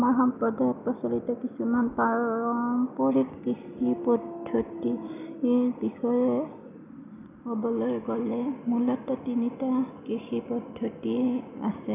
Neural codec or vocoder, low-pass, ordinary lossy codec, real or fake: none; 3.6 kHz; none; real